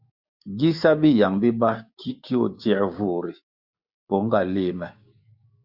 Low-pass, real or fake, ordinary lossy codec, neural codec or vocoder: 5.4 kHz; fake; Opus, 64 kbps; codec, 16 kHz, 6 kbps, DAC